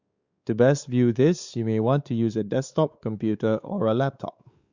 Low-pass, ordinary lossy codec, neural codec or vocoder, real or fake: 7.2 kHz; Opus, 64 kbps; codec, 16 kHz, 4 kbps, X-Codec, WavLM features, trained on Multilingual LibriSpeech; fake